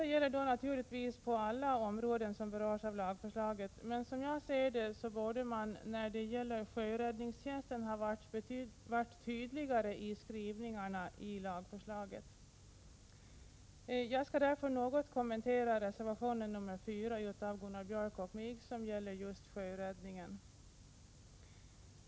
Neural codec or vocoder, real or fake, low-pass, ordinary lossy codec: none; real; none; none